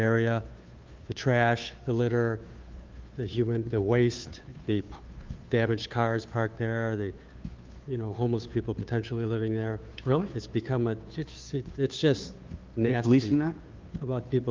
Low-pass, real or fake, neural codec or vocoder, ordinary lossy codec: 7.2 kHz; fake; codec, 16 kHz, 2 kbps, FunCodec, trained on Chinese and English, 25 frames a second; Opus, 32 kbps